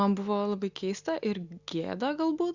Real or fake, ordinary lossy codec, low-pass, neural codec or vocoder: real; Opus, 64 kbps; 7.2 kHz; none